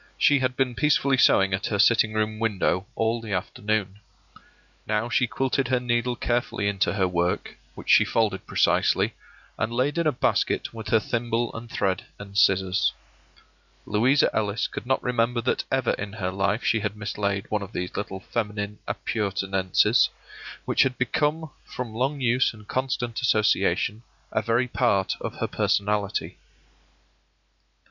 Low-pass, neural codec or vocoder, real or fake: 7.2 kHz; none; real